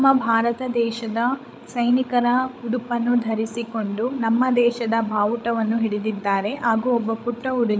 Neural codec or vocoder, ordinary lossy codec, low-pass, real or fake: codec, 16 kHz, 16 kbps, FreqCodec, larger model; none; none; fake